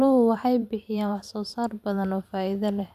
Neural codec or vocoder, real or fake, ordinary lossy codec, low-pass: vocoder, 44.1 kHz, 128 mel bands every 512 samples, BigVGAN v2; fake; none; 14.4 kHz